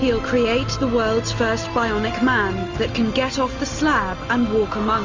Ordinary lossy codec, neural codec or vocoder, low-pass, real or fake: Opus, 32 kbps; none; 7.2 kHz; real